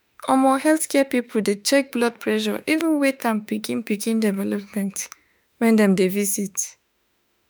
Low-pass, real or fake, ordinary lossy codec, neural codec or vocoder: none; fake; none; autoencoder, 48 kHz, 32 numbers a frame, DAC-VAE, trained on Japanese speech